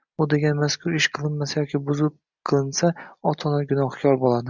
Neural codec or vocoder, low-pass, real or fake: none; 7.2 kHz; real